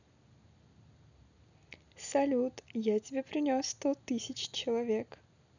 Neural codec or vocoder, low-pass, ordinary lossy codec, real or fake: none; 7.2 kHz; none; real